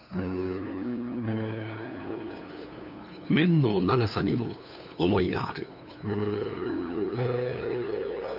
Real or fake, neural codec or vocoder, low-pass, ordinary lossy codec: fake; codec, 16 kHz, 2 kbps, FunCodec, trained on LibriTTS, 25 frames a second; 5.4 kHz; none